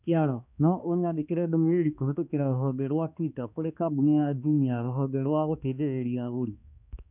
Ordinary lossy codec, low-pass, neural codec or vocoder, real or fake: none; 3.6 kHz; codec, 16 kHz, 2 kbps, X-Codec, HuBERT features, trained on balanced general audio; fake